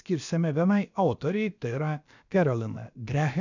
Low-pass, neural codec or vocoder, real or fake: 7.2 kHz; codec, 16 kHz, about 1 kbps, DyCAST, with the encoder's durations; fake